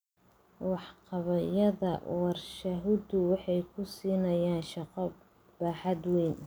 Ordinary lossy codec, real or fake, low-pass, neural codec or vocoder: none; real; none; none